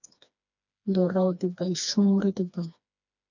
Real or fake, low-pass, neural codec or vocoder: fake; 7.2 kHz; codec, 16 kHz, 2 kbps, FreqCodec, smaller model